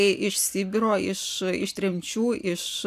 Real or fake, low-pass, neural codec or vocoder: real; 14.4 kHz; none